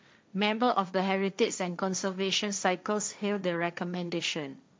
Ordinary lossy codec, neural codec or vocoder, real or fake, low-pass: none; codec, 16 kHz, 1.1 kbps, Voila-Tokenizer; fake; none